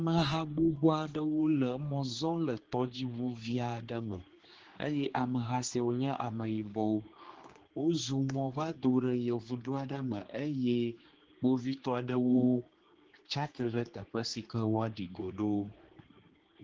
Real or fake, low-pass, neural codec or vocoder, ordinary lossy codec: fake; 7.2 kHz; codec, 16 kHz, 2 kbps, X-Codec, HuBERT features, trained on general audio; Opus, 16 kbps